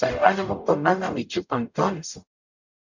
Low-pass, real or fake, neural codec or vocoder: 7.2 kHz; fake; codec, 44.1 kHz, 0.9 kbps, DAC